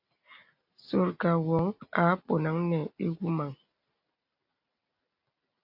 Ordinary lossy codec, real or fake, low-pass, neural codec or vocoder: AAC, 48 kbps; real; 5.4 kHz; none